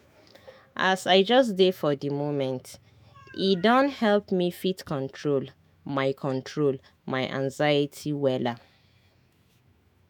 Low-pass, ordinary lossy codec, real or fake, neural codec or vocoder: 19.8 kHz; none; fake; autoencoder, 48 kHz, 128 numbers a frame, DAC-VAE, trained on Japanese speech